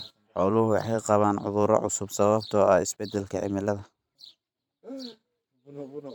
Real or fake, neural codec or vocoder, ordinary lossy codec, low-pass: fake; vocoder, 44.1 kHz, 128 mel bands every 512 samples, BigVGAN v2; none; 19.8 kHz